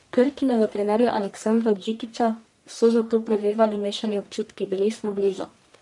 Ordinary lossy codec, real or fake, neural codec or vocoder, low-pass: none; fake; codec, 44.1 kHz, 1.7 kbps, Pupu-Codec; 10.8 kHz